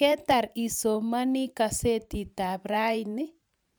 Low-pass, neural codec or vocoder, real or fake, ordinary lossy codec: none; vocoder, 44.1 kHz, 128 mel bands every 512 samples, BigVGAN v2; fake; none